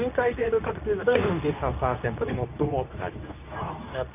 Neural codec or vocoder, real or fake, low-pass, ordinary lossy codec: codec, 24 kHz, 0.9 kbps, WavTokenizer, medium speech release version 2; fake; 3.6 kHz; none